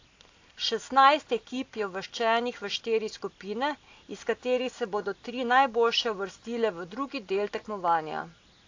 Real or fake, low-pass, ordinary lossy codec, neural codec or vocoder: real; 7.2 kHz; AAC, 48 kbps; none